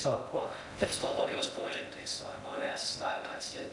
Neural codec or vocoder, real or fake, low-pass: codec, 16 kHz in and 24 kHz out, 0.6 kbps, FocalCodec, streaming, 4096 codes; fake; 10.8 kHz